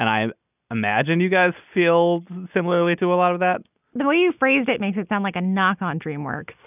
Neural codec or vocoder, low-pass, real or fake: none; 3.6 kHz; real